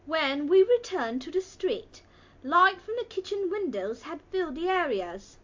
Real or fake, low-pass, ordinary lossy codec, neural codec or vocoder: real; 7.2 kHz; MP3, 48 kbps; none